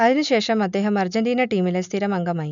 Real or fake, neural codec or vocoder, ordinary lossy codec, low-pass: real; none; none; 7.2 kHz